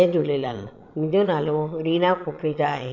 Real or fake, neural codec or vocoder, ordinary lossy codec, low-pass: fake; vocoder, 22.05 kHz, 80 mel bands, Vocos; none; 7.2 kHz